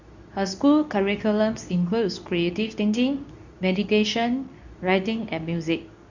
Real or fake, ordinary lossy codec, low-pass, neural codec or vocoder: fake; none; 7.2 kHz; codec, 24 kHz, 0.9 kbps, WavTokenizer, medium speech release version 2